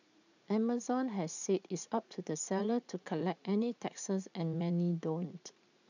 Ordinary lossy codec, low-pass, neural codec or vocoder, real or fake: none; 7.2 kHz; vocoder, 44.1 kHz, 80 mel bands, Vocos; fake